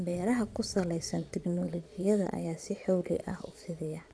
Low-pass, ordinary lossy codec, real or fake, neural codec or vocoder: none; none; fake; vocoder, 22.05 kHz, 80 mel bands, Vocos